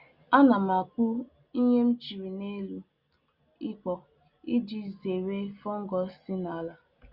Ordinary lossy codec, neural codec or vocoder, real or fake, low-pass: Opus, 64 kbps; none; real; 5.4 kHz